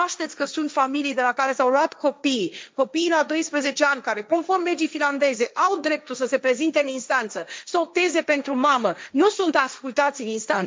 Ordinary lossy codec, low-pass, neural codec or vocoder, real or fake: none; none; codec, 16 kHz, 1.1 kbps, Voila-Tokenizer; fake